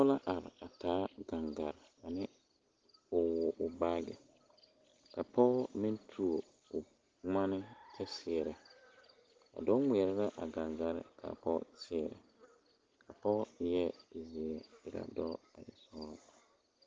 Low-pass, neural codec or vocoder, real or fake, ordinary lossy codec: 7.2 kHz; none; real; Opus, 16 kbps